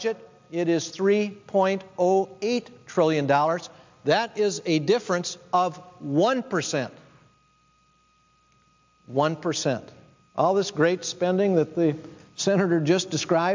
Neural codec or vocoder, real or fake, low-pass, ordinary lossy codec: none; real; 7.2 kHz; MP3, 64 kbps